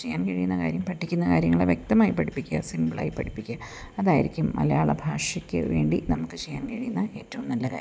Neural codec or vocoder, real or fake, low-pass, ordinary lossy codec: none; real; none; none